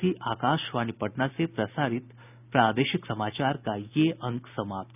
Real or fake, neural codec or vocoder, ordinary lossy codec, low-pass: real; none; none; 3.6 kHz